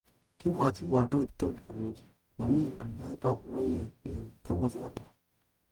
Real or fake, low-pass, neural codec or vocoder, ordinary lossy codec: fake; 19.8 kHz; codec, 44.1 kHz, 0.9 kbps, DAC; Opus, 32 kbps